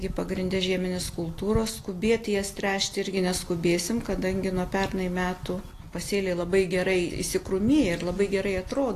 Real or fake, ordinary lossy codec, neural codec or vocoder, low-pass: real; AAC, 48 kbps; none; 14.4 kHz